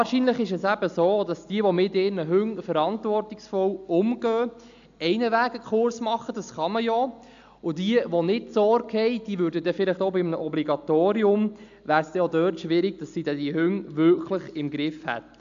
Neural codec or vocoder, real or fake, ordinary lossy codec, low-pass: none; real; AAC, 64 kbps; 7.2 kHz